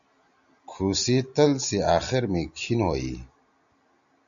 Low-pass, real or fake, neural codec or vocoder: 7.2 kHz; real; none